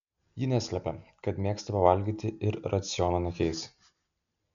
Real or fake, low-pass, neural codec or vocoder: real; 7.2 kHz; none